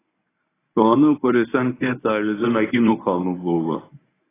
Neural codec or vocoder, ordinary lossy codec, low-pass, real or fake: codec, 24 kHz, 0.9 kbps, WavTokenizer, medium speech release version 1; AAC, 16 kbps; 3.6 kHz; fake